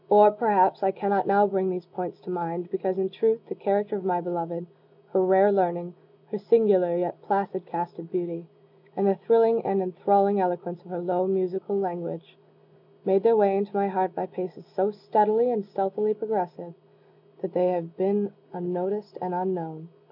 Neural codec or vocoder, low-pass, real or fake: none; 5.4 kHz; real